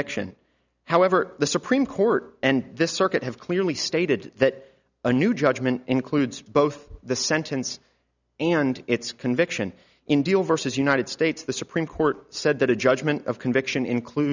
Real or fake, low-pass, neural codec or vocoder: real; 7.2 kHz; none